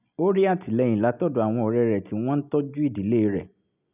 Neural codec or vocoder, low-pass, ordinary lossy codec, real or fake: none; 3.6 kHz; none; real